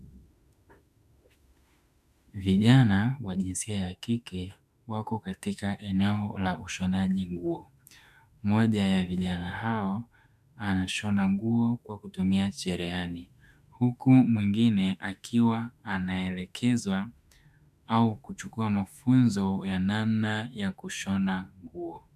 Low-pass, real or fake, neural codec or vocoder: 14.4 kHz; fake; autoencoder, 48 kHz, 32 numbers a frame, DAC-VAE, trained on Japanese speech